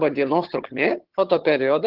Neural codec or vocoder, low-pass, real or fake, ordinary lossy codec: vocoder, 22.05 kHz, 80 mel bands, HiFi-GAN; 5.4 kHz; fake; Opus, 16 kbps